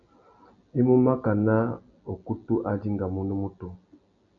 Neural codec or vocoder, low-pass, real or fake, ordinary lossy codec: none; 7.2 kHz; real; MP3, 64 kbps